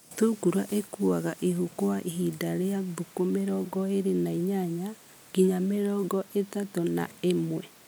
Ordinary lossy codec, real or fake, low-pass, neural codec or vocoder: none; real; none; none